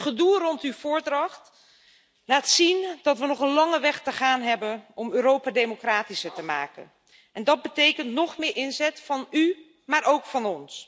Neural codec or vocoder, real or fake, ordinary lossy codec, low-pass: none; real; none; none